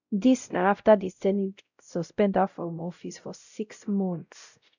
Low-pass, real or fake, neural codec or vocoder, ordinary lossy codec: 7.2 kHz; fake; codec, 16 kHz, 0.5 kbps, X-Codec, WavLM features, trained on Multilingual LibriSpeech; none